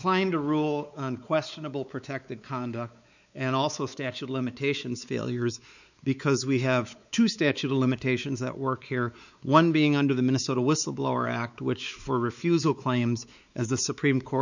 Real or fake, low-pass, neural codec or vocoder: fake; 7.2 kHz; codec, 16 kHz, 4 kbps, X-Codec, WavLM features, trained on Multilingual LibriSpeech